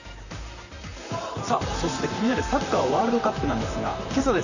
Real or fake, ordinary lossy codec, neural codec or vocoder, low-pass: fake; none; vocoder, 44.1 kHz, 128 mel bands, Pupu-Vocoder; 7.2 kHz